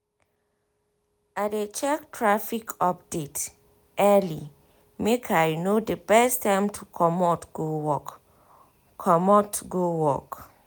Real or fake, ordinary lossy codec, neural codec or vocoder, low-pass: real; none; none; none